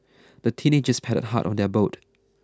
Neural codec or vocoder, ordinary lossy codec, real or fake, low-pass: none; none; real; none